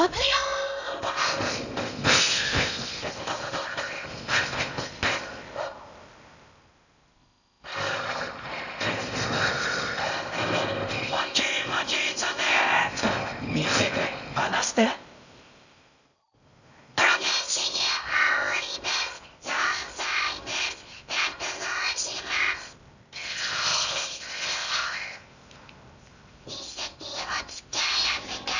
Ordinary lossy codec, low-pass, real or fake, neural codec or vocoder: none; 7.2 kHz; fake; codec, 16 kHz in and 24 kHz out, 0.6 kbps, FocalCodec, streaming, 4096 codes